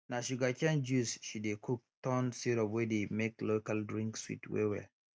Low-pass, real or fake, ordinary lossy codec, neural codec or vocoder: none; real; none; none